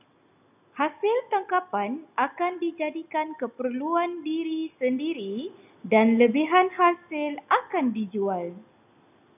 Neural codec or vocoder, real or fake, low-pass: none; real; 3.6 kHz